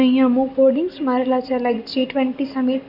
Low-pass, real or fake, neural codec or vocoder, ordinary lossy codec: 5.4 kHz; real; none; none